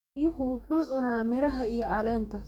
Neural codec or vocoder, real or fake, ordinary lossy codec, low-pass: codec, 44.1 kHz, 2.6 kbps, DAC; fake; none; 19.8 kHz